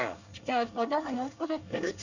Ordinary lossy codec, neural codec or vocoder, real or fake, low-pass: none; codec, 24 kHz, 1 kbps, SNAC; fake; 7.2 kHz